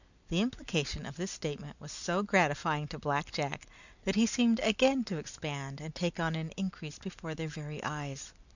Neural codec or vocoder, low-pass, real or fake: none; 7.2 kHz; real